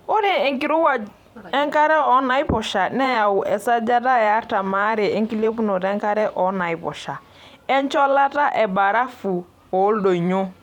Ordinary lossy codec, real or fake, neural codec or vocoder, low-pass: none; fake; vocoder, 44.1 kHz, 128 mel bands every 512 samples, BigVGAN v2; 19.8 kHz